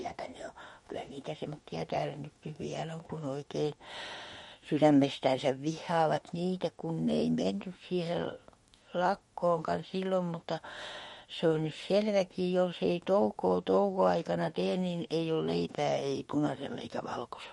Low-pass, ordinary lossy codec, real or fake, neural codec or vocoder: 19.8 kHz; MP3, 48 kbps; fake; autoencoder, 48 kHz, 32 numbers a frame, DAC-VAE, trained on Japanese speech